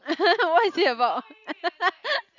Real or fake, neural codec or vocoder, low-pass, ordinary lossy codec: real; none; 7.2 kHz; none